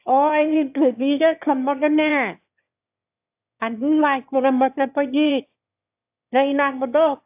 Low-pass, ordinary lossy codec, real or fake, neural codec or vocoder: 3.6 kHz; AAC, 32 kbps; fake; autoencoder, 22.05 kHz, a latent of 192 numbers a frame, VITS, trained on one speaker